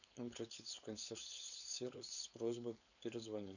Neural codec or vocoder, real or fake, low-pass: codec, 16 kHz, 4.8 kbps, FACodec; fake; 7.2 kHz